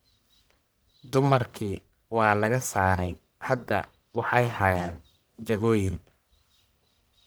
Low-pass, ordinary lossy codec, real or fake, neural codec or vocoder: none; none; fake; codec, 44.1 kHz, 1.7 kbps, Pupu-Codec